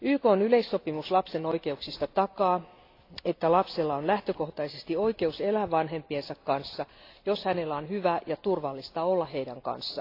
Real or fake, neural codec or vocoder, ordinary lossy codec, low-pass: real; none; AAC, 32 kbps; 5.4 kHz